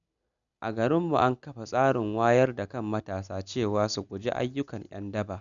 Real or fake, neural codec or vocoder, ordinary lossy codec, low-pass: real; none; none; 7.2 kHz